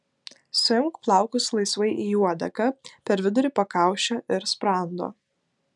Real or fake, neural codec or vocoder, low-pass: real; none; 10.8 kHz